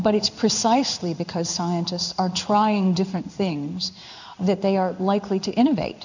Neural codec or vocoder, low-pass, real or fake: codec, 16 kHz in and 24 kHz out, 1 kbps, XY-Tokenizer; 7.2 kHz; fake